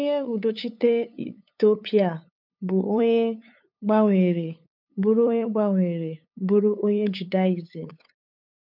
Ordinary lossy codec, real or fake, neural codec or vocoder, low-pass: none; fake; codec, 16 kHz, 8 kbps, FunCodec, trained on LibriTTS, 25 frames a second; 5.4 kHz